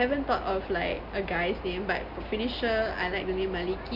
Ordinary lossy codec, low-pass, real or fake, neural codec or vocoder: none; 5.4 kHz; real; none